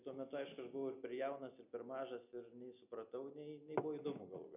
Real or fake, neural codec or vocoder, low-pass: real; none; 3.6 kHz